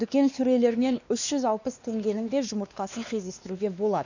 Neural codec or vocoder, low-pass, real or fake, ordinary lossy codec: codec, 16 kHz, 2 kbps, X-Codec, WavLM features, trained on Multilingual LibriSpeech; 7.2 kHz; fake; none